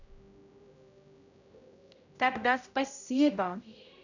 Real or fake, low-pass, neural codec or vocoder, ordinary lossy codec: fake; 7.2 kHz; codec, 16 kHz, 0.5 kbps, X-Codec, HuBERT features, trained on general audio; none